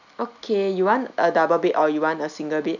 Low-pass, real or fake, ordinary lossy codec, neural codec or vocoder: 7.2 kHz; real; none; none